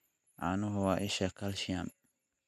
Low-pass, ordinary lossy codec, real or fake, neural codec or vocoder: 14.4 kHz; none; real; none